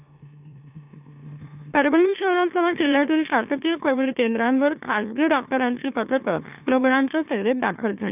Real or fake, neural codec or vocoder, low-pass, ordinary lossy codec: fake; autoencoder, 44.1 kHz, a latent of 192 numbers a frame, MeloTTS; 3.6 kHz; none